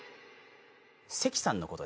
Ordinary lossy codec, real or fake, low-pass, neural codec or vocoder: none; real; none; none